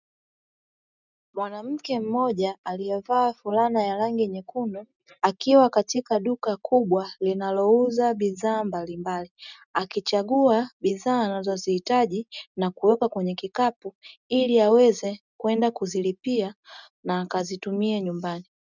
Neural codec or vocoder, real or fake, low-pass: none; real; 7.2 kHz